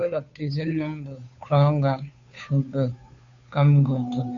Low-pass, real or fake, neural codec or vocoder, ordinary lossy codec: 7.2 kHz; fake; codec, 16 kHz, 2 kbps, FunCodec, trained on Chinese and English, 25 frames a second; AAC, 64 kbps